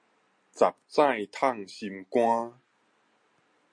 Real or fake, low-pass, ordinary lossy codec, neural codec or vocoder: real; 9.9 kHz; MP3, 48 kbps; none